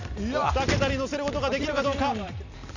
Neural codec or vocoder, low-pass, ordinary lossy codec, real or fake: none; 7.2 kHz; none; real